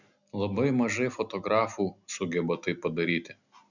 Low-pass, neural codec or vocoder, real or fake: 7.2 kHz; none; real